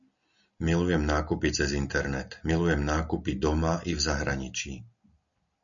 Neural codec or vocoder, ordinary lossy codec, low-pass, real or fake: none; MP3, 96 kbps; 7.2 kHz; real